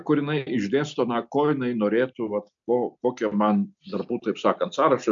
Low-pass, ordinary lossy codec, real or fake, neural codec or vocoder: 7.2 kHz; MP3, 64 kbps; real; none